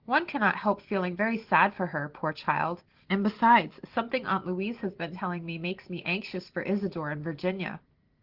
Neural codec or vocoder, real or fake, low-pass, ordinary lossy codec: none; real; 5.4 kHz; Opus, 16 kbps